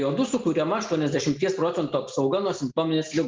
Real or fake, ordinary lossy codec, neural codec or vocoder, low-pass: real; Opus, 24 kbps; none; 7.2 kHz